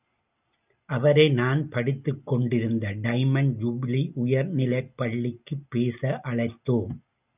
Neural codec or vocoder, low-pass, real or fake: none; 3.6 kHz; real